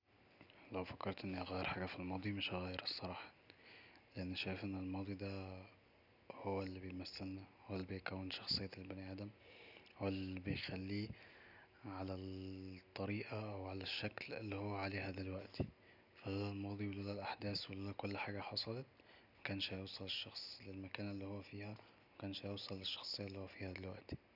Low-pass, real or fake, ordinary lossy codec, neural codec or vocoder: 5.4 kHz; real; none; none